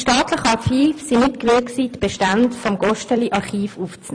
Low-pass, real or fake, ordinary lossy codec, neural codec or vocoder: 9.9 kHz; fake; AAC, 64 kbps; vocoder, 44.1 kHz, 128 mel bands every 256 samples, BigVGAN v2